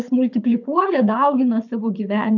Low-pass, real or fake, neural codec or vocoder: 7.2 kHz; fake; codec, 24 kHz, 6 kbps, HILCodec